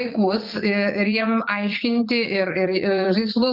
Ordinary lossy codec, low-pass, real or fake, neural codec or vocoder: Opus, 24 kbps; 5.4 kHz; fake; vocoder, 44.1 kHz, 80 mel bands, Vocos